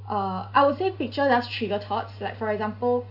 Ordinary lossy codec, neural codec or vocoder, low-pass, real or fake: none; none; 5.4 kHz; real